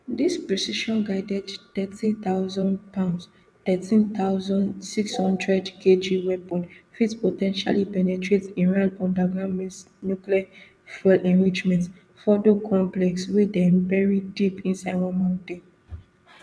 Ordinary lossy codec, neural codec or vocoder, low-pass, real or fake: none; vocoder, 22.05 kHz, 80 mel bands, WaveNeXt; none; fake